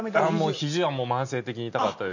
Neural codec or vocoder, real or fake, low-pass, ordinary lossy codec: none; real; 7.2 kHz; none